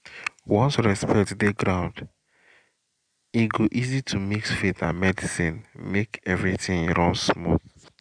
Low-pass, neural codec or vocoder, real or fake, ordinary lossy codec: 9.9 kHz; none; real; MP3, 96 kbps